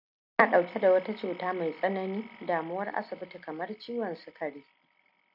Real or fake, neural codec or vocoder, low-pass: real; none; 5.4 kHz